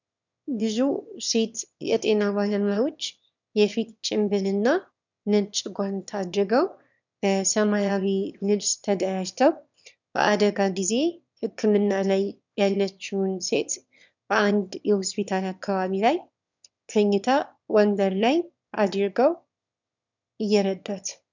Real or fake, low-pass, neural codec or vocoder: fake; 7.2 kHz; autoencoder, 22.05 kHz, a latent of 192 numbers a frame, VITS, trained on one speaker